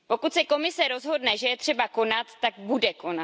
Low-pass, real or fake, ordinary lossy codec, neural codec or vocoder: none; real; none; none